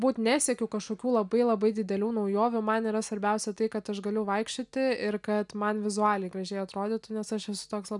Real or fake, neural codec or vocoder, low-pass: real; none; 10.8 kHz